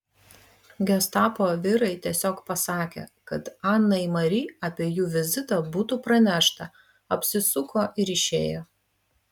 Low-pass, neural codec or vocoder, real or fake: 19.8 kHz; none; real